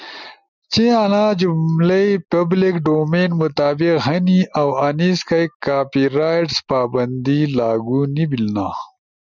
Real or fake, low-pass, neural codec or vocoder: real; 7.2 kHz; none